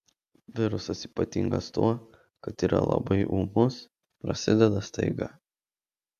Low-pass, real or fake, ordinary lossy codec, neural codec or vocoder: 14.4 kHz; real; AAC, 96 kbps; none